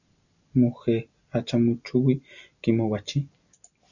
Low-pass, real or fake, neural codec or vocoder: 7.2 kHz; real; none